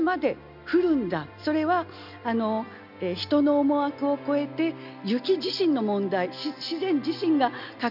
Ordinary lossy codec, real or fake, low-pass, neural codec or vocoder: none; real; 5.4 kHz; none